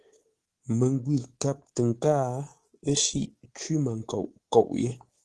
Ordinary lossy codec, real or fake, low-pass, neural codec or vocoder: Opus, 16 kbps; fake; 10.8 kHz; codec, 24 kHz, 3.1 kbps, DualCodec